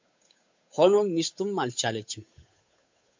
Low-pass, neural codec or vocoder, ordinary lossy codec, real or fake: 7.2 kHz; codec, 16 kHz, 8 kbps, FunCodec, trained on Chinese and English, 25 frames a second; MP3, 48 kbps; fake